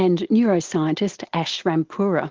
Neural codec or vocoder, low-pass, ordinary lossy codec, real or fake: none; 7.2 kHz; Opus, 16 kbps; real